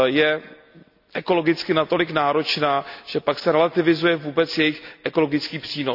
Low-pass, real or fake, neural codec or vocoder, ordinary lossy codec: 5.4 kHz; real; none; none